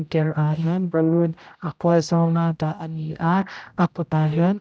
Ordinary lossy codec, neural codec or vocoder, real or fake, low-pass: none; codec, 16 kHz, 0.5 kbps, X-Codec, HuBERT features, trained on general audio; fake; none